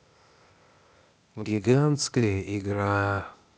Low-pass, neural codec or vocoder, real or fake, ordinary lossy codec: none; codec, 16 kHz, 0.8 kbps, ZipCodec; fake; none